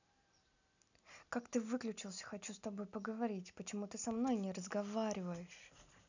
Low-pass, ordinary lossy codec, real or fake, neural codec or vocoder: 7.2 kHz; none; real; none